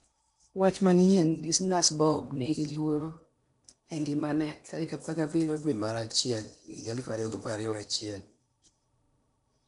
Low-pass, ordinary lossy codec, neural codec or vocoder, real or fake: 10.8 kHz; none; codec, 16 kHz in and 24 kHz out, 0.8 kbps, FocalCodec, streaming, 65536 codes; fake